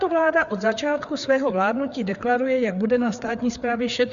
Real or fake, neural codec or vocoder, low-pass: fake; codec, 16 kHz, 4 kbps, FreqCodec, larger model; 7.2 kHz